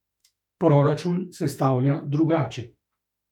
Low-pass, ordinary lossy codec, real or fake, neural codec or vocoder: 19.8 kHz; none; fake; autoencoder, 48 kHz, 32 numbers a frame, DAC-VAE, trained on Japanese speech